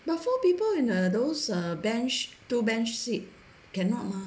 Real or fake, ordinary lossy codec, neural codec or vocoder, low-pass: real; none; none; none